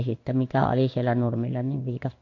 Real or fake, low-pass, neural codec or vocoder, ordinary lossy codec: fake; 7.2 kHz; codec, 16 kHz in and 24 kHz out, 1 kbps, XY-Tokenizer; MP3, 48 kbps